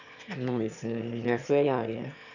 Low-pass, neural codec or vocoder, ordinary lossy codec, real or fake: 7.2 kHz; autoencoder, 22.05 kHz, a latent of 192 numbers a frame, VITS, trained on one speaker; none; fake